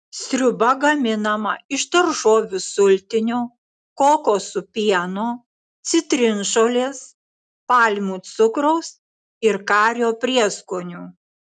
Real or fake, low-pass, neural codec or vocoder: real; 10.8 kHz; none